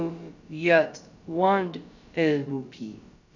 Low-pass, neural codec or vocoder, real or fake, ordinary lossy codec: 7.2 kHz; codec, 16 kHz, about 1 kbps, DyCAST, with the encoder's durations; fake; none